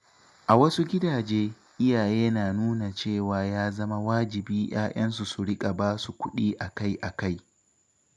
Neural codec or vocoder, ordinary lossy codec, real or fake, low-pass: none; none; real; none